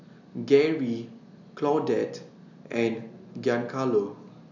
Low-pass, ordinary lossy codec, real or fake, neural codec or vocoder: 7.2 kHz; none; real; none